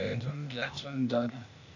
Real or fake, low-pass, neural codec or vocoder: fake; 7.2 kHz; codec, 16 kHz, 0.8 kbps, ZipCodec